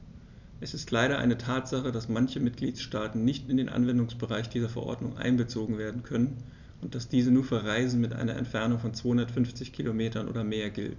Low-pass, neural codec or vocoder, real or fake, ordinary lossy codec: 7.2 kHz; none; real; none